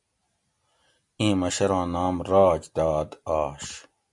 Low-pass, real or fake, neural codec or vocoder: 10.8 kHz; real; none